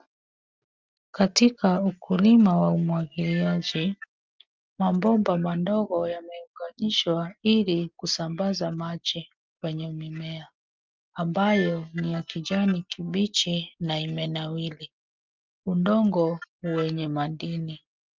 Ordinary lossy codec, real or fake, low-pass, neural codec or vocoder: Opus, 32 kbps; real; 7.2 kHz; none